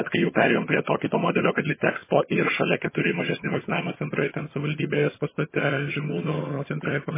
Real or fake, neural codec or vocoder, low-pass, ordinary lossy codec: fake; vocoder, 22.05 kHz, 80 mel bands, HiFi-GAN; 3.6 kHz; MP3, 16 kbps